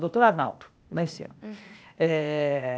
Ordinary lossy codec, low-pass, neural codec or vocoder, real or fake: none; none; codec, 16 kHz, 0.8 kbps, ZipCodec; fake